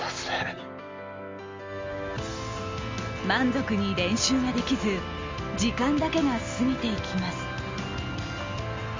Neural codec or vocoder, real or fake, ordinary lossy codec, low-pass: none; real; Opus, 32 kbps; 7.2 kHz